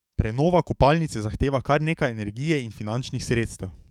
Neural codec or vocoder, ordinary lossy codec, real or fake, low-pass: codec, 44.1 kHz, 7.8 kbps, DAC; none; fake; 19.8 kHz